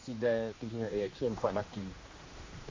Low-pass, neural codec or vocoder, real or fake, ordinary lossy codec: 7.2 kHz; codec, 16 kHz, 2 kbps, X-Codec, HuBERT features, trained on general audio; fake; MP3, 32 kbps